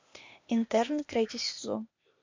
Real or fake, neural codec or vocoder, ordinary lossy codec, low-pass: fake; codec, 16 kHz, 0.8 kbps, ZipCodec; MP3, 48 kbps; 7.2 kHz